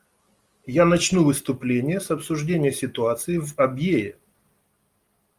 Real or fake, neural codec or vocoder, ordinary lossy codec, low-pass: real; none; Opus, 32 kbps; 14.4 kHz